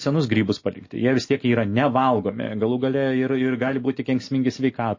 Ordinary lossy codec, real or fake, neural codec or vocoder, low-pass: MP3, 32 kbps; real; none; 7.2 kHz